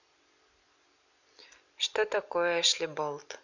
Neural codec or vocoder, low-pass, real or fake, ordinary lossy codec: none; 7.2 kHz; real; none